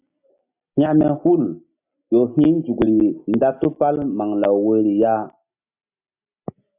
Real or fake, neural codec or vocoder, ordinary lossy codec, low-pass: real; none; AAC, 32 kbps; 3.6 kHz